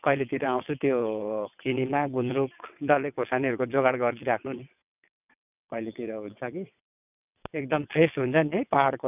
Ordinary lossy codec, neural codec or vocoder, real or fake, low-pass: none; vocoder, 22.05 kHz, 80 mel bands, WaveNeXt; fake; 3.6 kHz